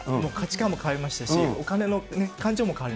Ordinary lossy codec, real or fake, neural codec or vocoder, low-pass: none; real; none; none